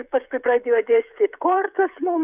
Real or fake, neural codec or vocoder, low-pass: fake; codec, 16 kHz, 16 kbps, FreqCodec, smaller model; 3.6 kHz